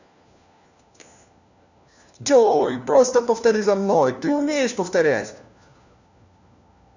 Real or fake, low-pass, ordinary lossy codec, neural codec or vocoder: fake; 7.2 kHz; none; codec, 16 kHz, 1 kbps, FunCodec, trained on LibriTTS, 50 frames a second